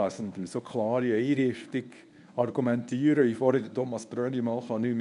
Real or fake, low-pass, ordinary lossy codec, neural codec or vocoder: fake; 10.8 kHz; none; codec, 24 kHz, 0.9 kbps, WavTokenizer, medium speech release version 1